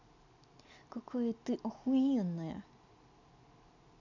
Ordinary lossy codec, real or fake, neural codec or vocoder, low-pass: none; real; none; 7.2 kHz